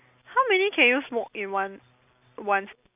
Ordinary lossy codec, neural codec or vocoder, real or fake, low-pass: none; none; real; 3.6 kHz